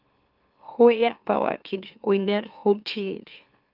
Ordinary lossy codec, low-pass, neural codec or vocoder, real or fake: Opus, 24 kbps; 5.4 kHz; autoencoder, 44.1 kHz, a latent of 192 numbers a frame, MeloTTS; fake